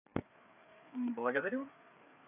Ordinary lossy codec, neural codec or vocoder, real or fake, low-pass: none; codec, 16 kHz, 4 kbps, FreqCodec, larger model; fake; 3.6 kHz